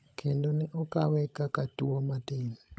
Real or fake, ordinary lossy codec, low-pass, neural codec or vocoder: fake; none; none; codec, 16 kHz, 16 kbps, FunCodec, trained on LibriTTS, 50 frames a second